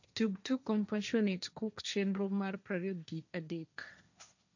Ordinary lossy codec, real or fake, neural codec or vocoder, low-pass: none; fake; codec, 16 kHz, 1.1 kbps, Voila-Tokenizer; 7.2 kHz